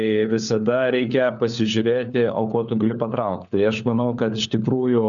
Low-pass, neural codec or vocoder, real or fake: 7.2 kHz; codec, 16 kHz, 4 kbps, FunCodec, trained on LibriTTS, 50 frames a second; fake